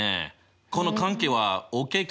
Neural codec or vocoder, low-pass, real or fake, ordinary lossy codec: none; none; real; none